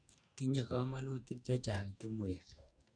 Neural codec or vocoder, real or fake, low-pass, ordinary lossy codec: codec, 44.1 kHz, 2.6 kbps, DAC; fake; 9.9 kHz; none